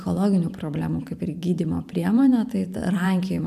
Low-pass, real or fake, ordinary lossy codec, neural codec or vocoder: 14.4 kHz; fake; AAC, 96 kbps; vocoder, 44.1 kHz, 128 mel bands every 512 samples, BigVGAN v2